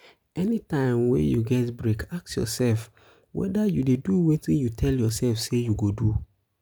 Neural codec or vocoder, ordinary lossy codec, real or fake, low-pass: none; none; real; none